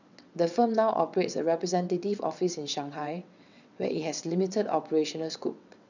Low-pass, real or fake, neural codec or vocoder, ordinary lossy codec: 7.2 kHz; fake; vocoder, 22.05 kHz, 80 mel bands, WaveNeXt; none